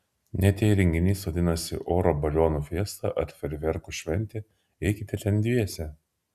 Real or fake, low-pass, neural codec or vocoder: real; 14.4 kHz; none